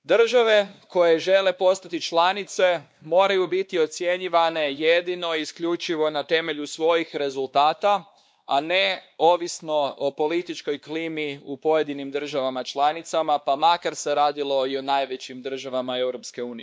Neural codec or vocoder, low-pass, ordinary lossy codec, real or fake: codec, 16 kHz, 2 kbps, X-Codec, WavLM features, trained on Multilingual LibriSpeech; none; none; fake